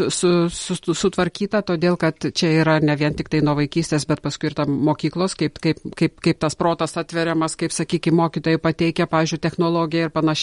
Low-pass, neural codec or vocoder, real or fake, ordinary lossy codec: 14.4 kHz; none; real; MP3, 48 kbps